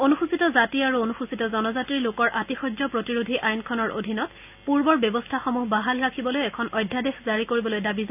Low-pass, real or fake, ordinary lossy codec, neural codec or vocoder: 3.6 kHz; real; none; none